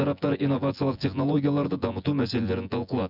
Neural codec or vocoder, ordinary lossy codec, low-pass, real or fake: vocoder, 24 kHz, 100 mel bands, Vocos; none; 5.4 kHz; fake